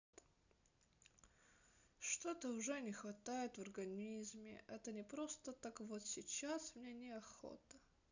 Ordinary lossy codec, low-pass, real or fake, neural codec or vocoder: none; 7.2 kHz; real; none